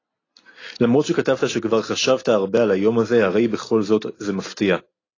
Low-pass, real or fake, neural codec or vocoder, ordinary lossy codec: 7.2 kHz; real; none; AAC, 32 kbps